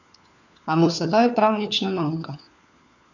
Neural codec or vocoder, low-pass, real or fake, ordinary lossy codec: codec, 16 kHz, 4 kbps, FunCodec, trained on LibriTTS, 50 frames a second; 7.2 kHz; fake; none